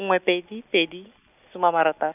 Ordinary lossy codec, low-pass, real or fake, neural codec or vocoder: none; 3.6 kHz; real; none